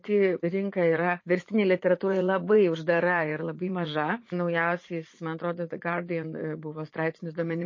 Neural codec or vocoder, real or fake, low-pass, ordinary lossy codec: vocoder, 44.1 kHz, 80 mel bands, Vocos; fake; 7.2 kHz; MP3, 32 kbps